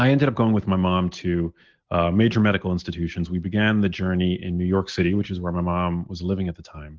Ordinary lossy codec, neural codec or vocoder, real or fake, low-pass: Opus, 16 kbps; none; real; 7.2 kHz